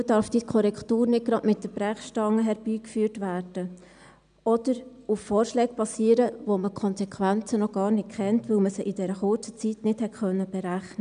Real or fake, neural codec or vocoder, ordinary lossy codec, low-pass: real; none; none; 9.9 kHz